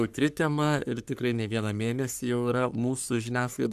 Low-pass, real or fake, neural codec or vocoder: 14.4 kHz; fake; codec, 44.1 kHz, 3.4 kbps, Pupu-Codec